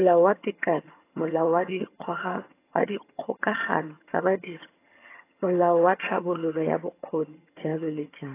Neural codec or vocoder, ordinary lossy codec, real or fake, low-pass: vocoder, 22.05 kHz, 80 mel bands, HiFi-GAN; AAC, 24 kbps; fake; 3.6 kHz